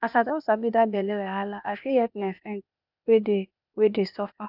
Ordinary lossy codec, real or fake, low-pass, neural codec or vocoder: none; fake; 5.4 kHz; codec, 16 kHz, 0.8 kbps, ZipCodec